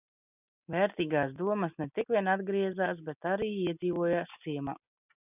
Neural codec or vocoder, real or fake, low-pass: none; real; 3.6 kHz